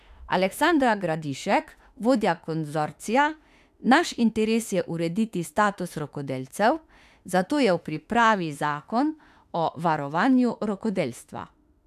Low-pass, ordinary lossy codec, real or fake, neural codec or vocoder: 14.4 kHz; AAC, 96 kbps; fake; autoencoder, 48 kHz, 32 numbers a frame, DAC-VAE, trained on Japanese speech